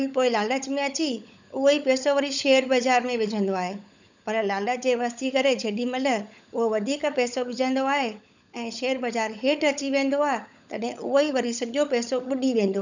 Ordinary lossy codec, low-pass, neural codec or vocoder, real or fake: none; 7.2 kHz; codec, 16 kHz, 16 kbps, FunCodec, trained on LibriTTS, 50 frames a second; fake